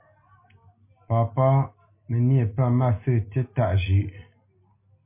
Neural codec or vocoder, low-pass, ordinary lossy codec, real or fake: none; 3.6 kHz; MP3, 24 kbps; real